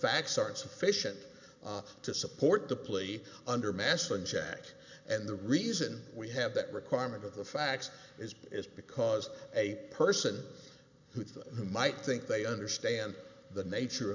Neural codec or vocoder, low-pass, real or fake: none; 7.2 kHz; real